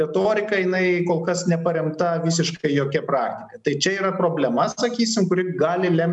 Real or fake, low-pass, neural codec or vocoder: real; 10.8 kHz; none